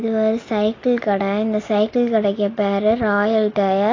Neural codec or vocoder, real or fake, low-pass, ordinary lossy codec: none; real; 7.2 kHz; AAC, 32 kbps